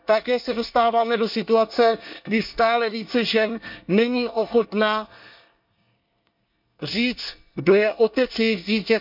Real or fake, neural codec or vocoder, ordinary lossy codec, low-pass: fake; codec, 24 kHz, 1 kbps, SNAC; MP3, 48 kbps; 5.4 kHz